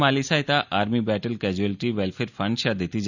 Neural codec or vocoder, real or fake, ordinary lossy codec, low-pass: none; real; none; 7.2 kHz